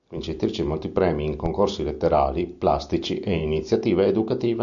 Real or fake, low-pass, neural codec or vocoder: real; 7.2 kHz; none